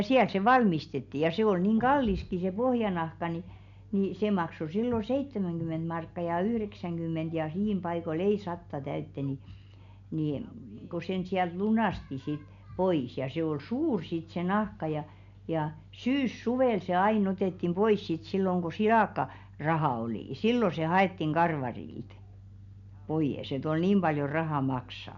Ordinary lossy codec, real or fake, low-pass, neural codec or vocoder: none; real; 7.2 kHz; none